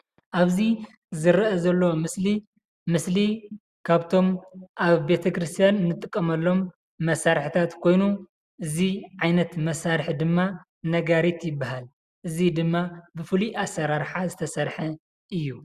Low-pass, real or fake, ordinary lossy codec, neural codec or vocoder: 14.4 kHz; real; Opus, 64 kbps; none